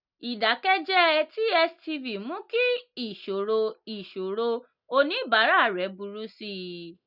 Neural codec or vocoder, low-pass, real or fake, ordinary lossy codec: none; 5.4 kHz; real; none